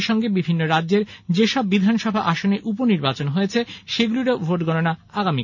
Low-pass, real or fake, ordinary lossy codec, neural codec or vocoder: 7.2 kHz; real; none; none